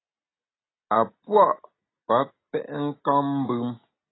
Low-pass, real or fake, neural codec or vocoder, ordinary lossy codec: 7.2 kHz; real; none; AAC, 16 kbps